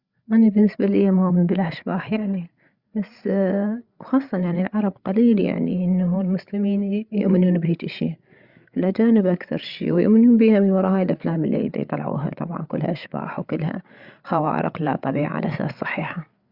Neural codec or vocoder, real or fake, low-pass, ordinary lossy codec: codec, 16 kHz, 8 kbps, FreqCodec, larger model; fake; 5.4 kHz; Opus, 64 kbps